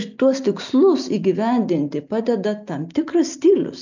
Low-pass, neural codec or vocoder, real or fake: 7.2 kHz; none; real